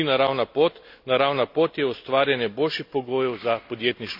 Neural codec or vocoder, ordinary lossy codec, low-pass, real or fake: none; none; 5.4 kHz; real